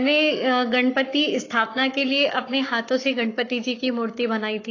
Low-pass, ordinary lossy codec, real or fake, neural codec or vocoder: 7.2 kHz; AAC, 32 kbps; fake; codec, 44.1 kHz, 7.8 kbps, Pupu-Codec